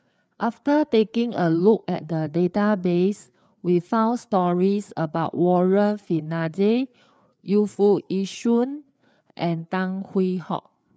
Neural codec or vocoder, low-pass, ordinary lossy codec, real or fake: codec, 16 kHz, 4 kbps, FreqCodec, larger model; none; none; fake